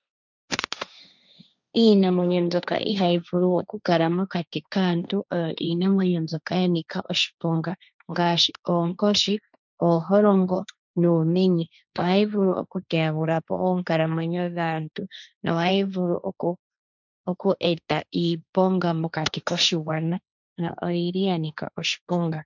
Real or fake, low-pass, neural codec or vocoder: fake; 7.2 kHz; codec, 16 kHz, 1.1 kbps, Voila-Tokenizer